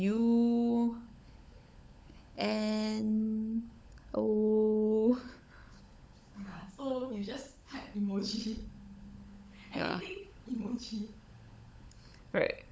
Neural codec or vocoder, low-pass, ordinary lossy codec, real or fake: codec, 16 kHz, 16 kbps, FunCodec, trained on LibriTTS, 50 frames a second; none; none; fake